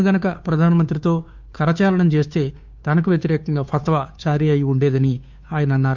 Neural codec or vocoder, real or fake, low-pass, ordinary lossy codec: codec, 16 kHz, 2 kbps, FunCodec, trained on Chinese and English, 25 frames a second; fake; 7.2 kHz; MP3, 64 kbps